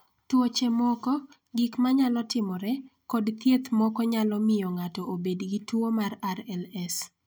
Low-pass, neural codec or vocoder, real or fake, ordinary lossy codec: none; none; real; none